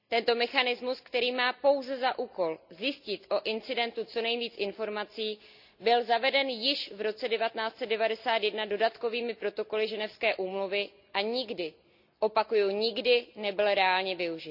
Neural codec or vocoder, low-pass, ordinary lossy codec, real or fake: none; 5.4 kHz; none; real